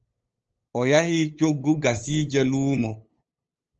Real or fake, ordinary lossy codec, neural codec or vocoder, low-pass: fake; Opus, 24 kbps; codec, 16 kHz, 8 kbps, FunCodec, trained on LibriTTS, 25 frames a second; 7.2 kHz